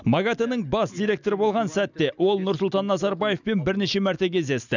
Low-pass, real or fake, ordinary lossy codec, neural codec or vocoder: 7.2 kHz; real; none; none